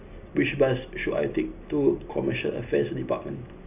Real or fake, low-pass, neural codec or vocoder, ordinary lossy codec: real; 3.6 kHz; none; none